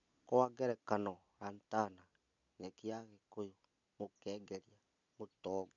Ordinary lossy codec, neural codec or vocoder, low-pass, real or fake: none; none; 7.2 kHz; real